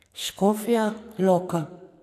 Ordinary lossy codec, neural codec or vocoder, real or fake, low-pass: none; codec, 44.1 kHz, 2.6 kbps, SNAC; fake; 14.4 kHz